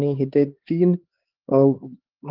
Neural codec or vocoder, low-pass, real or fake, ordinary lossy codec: codec, 16 kHz, 4 kbps, X-Codec, HuBERT features, trained on LibriSpeech; 5.4 kHz; fake; Opus, 16 kbps